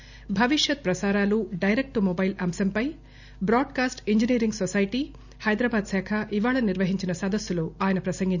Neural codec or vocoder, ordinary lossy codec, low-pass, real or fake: none; none; 7.2 kHz; real